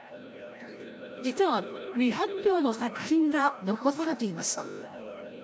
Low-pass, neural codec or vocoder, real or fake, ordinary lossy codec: none; codec, 16 kHz, 0.5 kbps, FreqCodec, larger model; fake; none